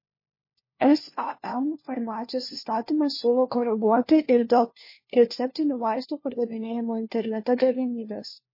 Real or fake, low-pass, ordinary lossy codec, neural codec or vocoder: fake; 5.4 kHz; MP3, 24 kbps; codec, 16 kHz, 1 kbps, FunCodec, trained on LibriTTS, 50 frames a second